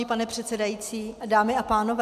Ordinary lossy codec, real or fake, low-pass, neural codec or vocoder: MP3, 96 kbps; real; 14.4 kHz; none